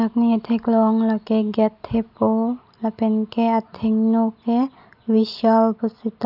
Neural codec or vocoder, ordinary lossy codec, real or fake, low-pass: none; none; real; 5.4 kHz